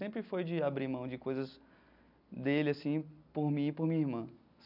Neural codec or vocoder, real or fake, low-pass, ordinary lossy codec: none; real; 5.4 kHz; none